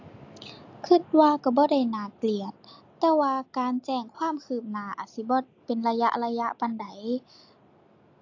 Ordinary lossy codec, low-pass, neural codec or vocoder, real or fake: AAC, 48 kbps; 7.2 kHz; none; real